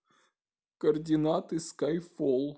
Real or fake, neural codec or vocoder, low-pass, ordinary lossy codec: real; none; none; none